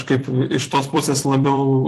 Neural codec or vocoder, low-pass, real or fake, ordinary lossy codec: vocoder, 44.1 kHz, 128 mel bands every 256 samples, BigVGAN v2; 14.4 kHz; fake; AAC, 64 kbps